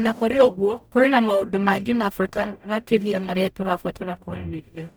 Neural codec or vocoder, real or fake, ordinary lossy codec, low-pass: codec, 44.1 kHz, 0.9 kbps, DAC; fake; none; none